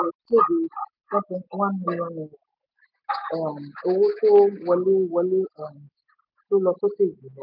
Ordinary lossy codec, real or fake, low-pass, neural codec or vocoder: none; real; 5.4 kHz; none